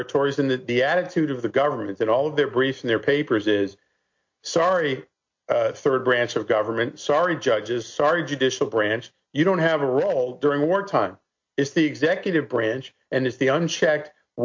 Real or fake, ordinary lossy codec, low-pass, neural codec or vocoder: real; MP3, 48 kbps; 7.2 kHz; none